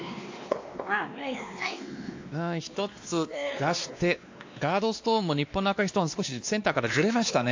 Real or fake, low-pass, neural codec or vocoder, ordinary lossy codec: fake; 7.2 kHz; codec, 16 kHz, 2 kbps, X-Codec, WavLM features, trained on Multilingual LibriSpeech; AAC, 48 kbps